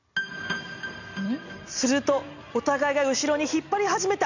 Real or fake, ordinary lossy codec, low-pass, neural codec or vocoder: real; none; 7.2 kHz; none